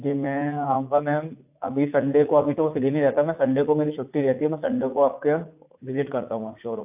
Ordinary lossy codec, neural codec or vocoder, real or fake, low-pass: AAC, 32 kbps; vocoder, 44.1 kHz, 80 mel bands, Vocos; fake; 3.6 kHz